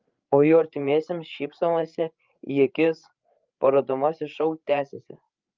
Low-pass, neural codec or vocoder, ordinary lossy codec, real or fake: 7.2 kHz; codec, 16 kHz, 8 kbps, FreqCodec, larger model; Opus, 24 kbps; fake